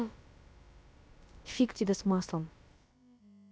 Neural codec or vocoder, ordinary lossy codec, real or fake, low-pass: codec, 16 kHz, about 1 kbps, DyCAST, with the encoder's durations; none; fake; none